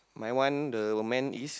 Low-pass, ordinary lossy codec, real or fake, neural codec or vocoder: none; none; real; none